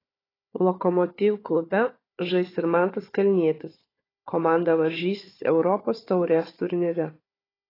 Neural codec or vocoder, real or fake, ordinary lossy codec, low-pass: codec, 16 kHz, 4 kbps, FunCodec, trained on Chinese and English, 50 frames a second; fake; AAC, 24 kbps; 5.4 kHz